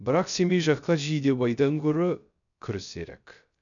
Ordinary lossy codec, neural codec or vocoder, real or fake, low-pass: none; codec, 16 kHz, 0.3 kbps, FocalCodec; fake; 7.2 kHz